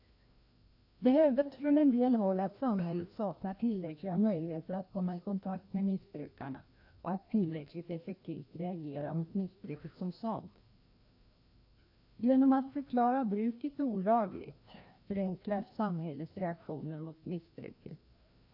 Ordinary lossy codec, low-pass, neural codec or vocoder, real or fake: AAC, 48 kbps; 5.4 kHz; codec, 16 kHz, 1 kbps, FreqCodec, larger model; fake